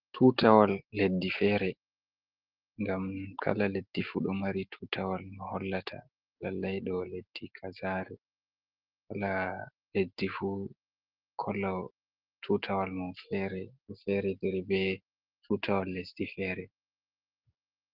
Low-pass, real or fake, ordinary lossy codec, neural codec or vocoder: 5.4 kHz; real; Opus, 16 kbps; none